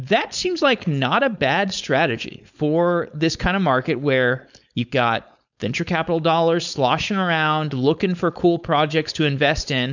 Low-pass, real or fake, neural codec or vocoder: 7.2 kHz; fake; codec, 16 kHz, 4.8 kbps, FACodec